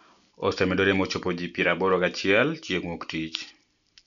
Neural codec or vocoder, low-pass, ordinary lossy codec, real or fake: none; 7.2 kHz; MP3, 96 kbps; real